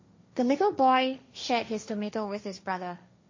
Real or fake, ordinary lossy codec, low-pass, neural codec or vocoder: fake; MP3, 32 kbps; 7.2 kHz; codec, 16 kHz, 1.1 kbps, Voila-Tokenizer